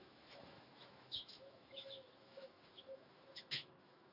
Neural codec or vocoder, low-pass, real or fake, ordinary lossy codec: autoencoder, 48 kHz, 128 numbers a frame, DAC-VAE, trained on Japanese speech; 5.4 kHz; fake; Opus, 64 kbps